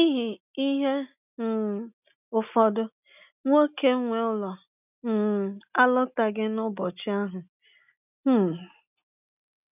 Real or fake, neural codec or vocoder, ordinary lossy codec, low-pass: real; none; none; 3.6 kHz